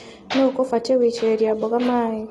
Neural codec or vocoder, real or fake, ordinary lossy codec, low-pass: none; real; AAC, 32 kbps; 19.8 kHz